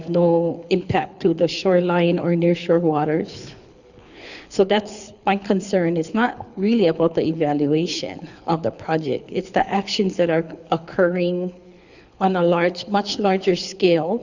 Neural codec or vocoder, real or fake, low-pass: codec, 24 kHz, 6 kbps, HILCodec; fake; 7.2 kHz